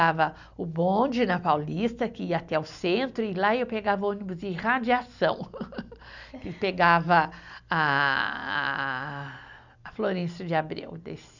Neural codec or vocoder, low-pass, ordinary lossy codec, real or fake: none; 7.2 kHz; none; real